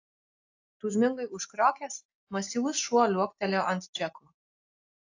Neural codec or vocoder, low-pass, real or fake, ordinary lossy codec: none; 7.2 kHz; real; AAC, 48 kbps